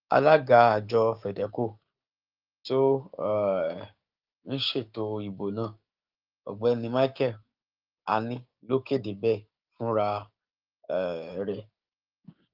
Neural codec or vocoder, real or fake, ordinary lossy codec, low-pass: codec, 16 kHz, 6 kbps, DAC; fake; Opus, 24 kbps; 5.4 kHz